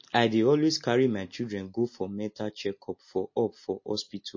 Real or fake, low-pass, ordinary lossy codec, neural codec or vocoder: real; 7.2 kHz; MP3, 32 kbps; none